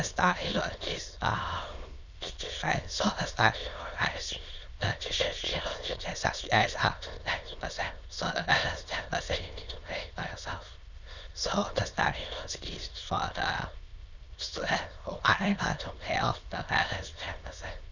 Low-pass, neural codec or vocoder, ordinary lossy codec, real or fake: 7.2 kHz; autoencoder, 22.05 kHz, a latent of 192 numbers a frame, VITS, trained on many speakers; none; fake